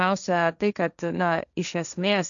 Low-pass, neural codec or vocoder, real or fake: 7.2 kHz; codec, 16 kHz, 1.1 kbps, Voila-Tokenizer; fake